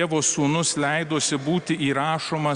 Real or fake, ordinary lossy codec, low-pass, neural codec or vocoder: real; Opus, 64 kbps; 9.9 kHz; none